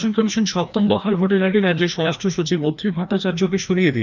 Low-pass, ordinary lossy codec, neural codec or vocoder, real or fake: 7.2 kHz; none; codec, 16 kHz, 1 kbps, FreqCodec, larger model; fake